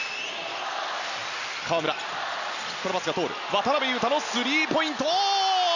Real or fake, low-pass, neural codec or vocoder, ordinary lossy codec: real; 7.2 kHz; none; none